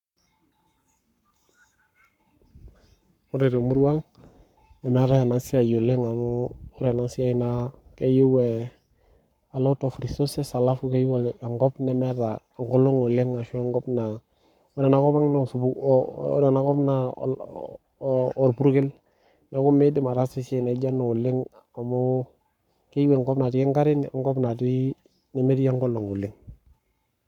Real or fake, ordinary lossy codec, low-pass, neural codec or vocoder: fake; none; 19.8 kHz; codec, 44.1 kHz, 7.8 kbps, Pupu-Codec